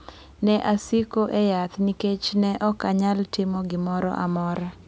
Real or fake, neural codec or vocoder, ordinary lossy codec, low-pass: real; none; none; none